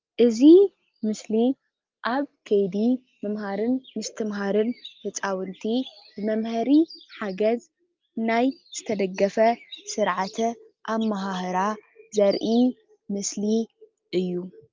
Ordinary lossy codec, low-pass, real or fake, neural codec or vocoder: Opus, 32 kbps; 7.2 kHz; real; none